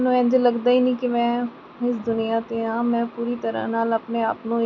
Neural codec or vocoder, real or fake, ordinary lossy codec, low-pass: none; real; none; 7.2 kHz